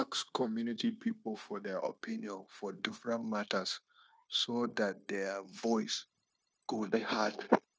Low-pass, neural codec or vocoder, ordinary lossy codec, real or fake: none; codec, 16 kHz, 0.9 kbps, LongCat-Audio-Codec; none; fake